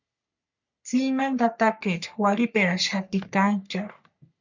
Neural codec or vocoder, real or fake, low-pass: codec, 44.1 kHz, 3.4 kbps, Pupu-Codec; fake; 7.2 kHz